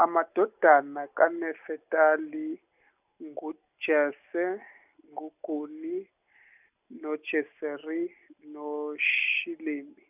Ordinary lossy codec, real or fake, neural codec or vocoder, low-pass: none; real; none; 3.6 kHz